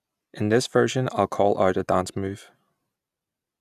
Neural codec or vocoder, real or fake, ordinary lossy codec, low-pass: none; real; none; 14.4 kHz